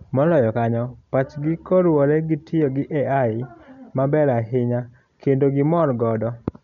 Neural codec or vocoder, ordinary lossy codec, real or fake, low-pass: none; none; real; 7.2 kHz